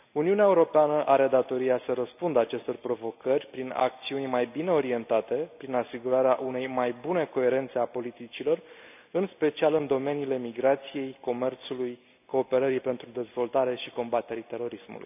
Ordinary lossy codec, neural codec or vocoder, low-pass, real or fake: none; none; 3.6 kHz; real